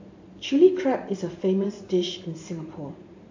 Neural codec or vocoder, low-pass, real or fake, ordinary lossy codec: vocoder, 44.1 kHz, 128 mel bands every 512 samples, BigVGAN v2; 7.2 kHz; fake; AAC, 48 kbps